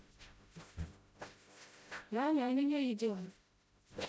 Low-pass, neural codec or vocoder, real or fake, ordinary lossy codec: none; codec, 16 kHz, 0.5 kbps, FreqCodec, smaller model; fake; none